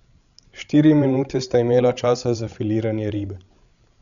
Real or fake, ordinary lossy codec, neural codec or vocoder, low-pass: fake; none; codec, 16 kHz, 16 kbps, FreqCodec, larger model; 7.2 kHz